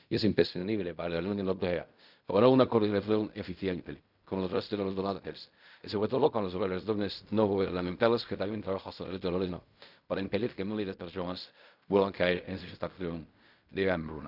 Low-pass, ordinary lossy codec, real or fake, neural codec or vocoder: 5.4 kHz; none; fake; codec, 16 kHz in and 24 kHz out, 0.4 kbps, LongCat-Audio-Codec, fine tuned four codebook decoder